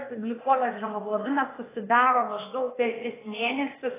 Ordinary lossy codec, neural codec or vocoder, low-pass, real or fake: AAC, 16 kbps; codec, 16 kHz, 0.8 kbps, ZipCodec; 3.6 kHz; fake